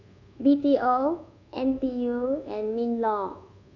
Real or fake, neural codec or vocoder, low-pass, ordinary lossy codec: fake; codec, 24 kHz, 1.2 kbps, DualCodec; 7.2 kHz; none